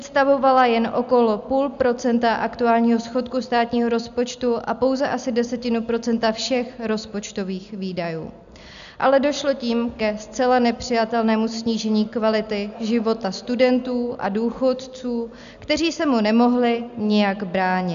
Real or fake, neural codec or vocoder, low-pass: real; none; 7.2 kHz